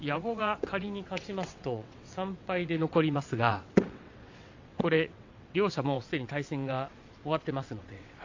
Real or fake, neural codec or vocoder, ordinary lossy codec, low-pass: fake; vocoder, 22.05 kHz, 80 mel bands, WaveNeXt; AAC, 48 kbps; 7.2 kHz